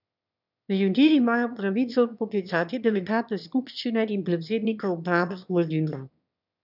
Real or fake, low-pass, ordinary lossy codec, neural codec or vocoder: fake; 5.4 kHz; none; autoencoder, 22.05 kHz, a latent of 192 numbers a frame, VITS, trained on one speaker